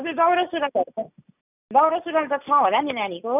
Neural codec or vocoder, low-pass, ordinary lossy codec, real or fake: none; 3.6 kHz; none; real